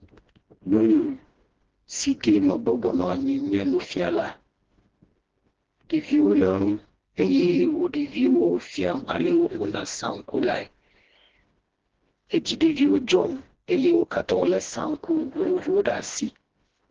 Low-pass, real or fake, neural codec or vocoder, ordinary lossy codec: 7.2 kHz; fake; codec, 16 kHz, 1 kbps, FreqCodec, smaller model; Opus, 16 kbps